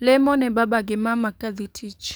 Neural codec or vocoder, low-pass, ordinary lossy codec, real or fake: codec, 44.1 kHz, 7.8 kbps, DAC; none; none; fake